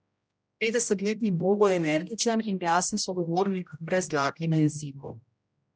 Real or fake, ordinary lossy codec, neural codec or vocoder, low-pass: fake; none; codec, 16 kHz, 0.5 kbps, X-Codec, HuBERT features, trained on general audio; none